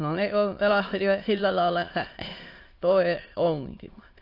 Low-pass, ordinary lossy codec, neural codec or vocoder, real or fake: 5.4 kHz; AAC, 32 kbps; autoencoder, 22.05 kHz, a latent of 192 numbers a frame, VITS, trained on many speakers; fake